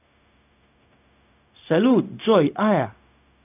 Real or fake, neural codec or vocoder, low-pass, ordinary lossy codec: fake; codec, 16 kHz, 0.4 kbps, LongCat-Audio-Codec; 3.6 kHz; none